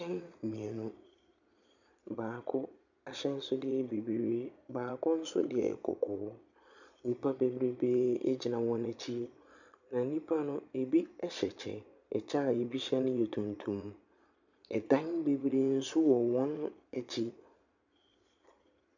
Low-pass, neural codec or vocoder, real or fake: 7.2 kHz; vocoder, 22.05 kHz, 80 mel bands, Vocos; fake